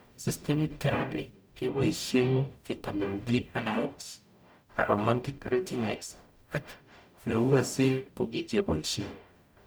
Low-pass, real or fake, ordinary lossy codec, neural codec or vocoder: none; fake; none; codec, 44.1 kHz, 0.9 kbps, DAC